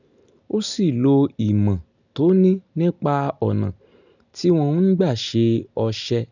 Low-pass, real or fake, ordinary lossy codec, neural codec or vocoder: 7.2 kHz; real; none; none